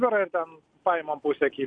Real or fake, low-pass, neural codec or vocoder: real; 9.9 kHz; none